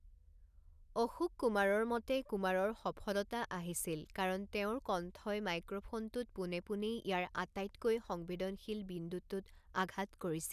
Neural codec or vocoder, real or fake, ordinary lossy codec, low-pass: none; real; none; 14.4 kHz